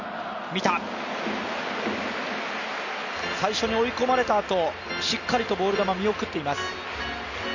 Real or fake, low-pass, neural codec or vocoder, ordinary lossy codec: real; 7.2 kHz; none; none